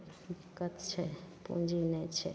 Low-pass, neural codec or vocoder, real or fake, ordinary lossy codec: none; none; real; none